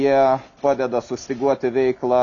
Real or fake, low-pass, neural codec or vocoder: real; 7.2 kHz; none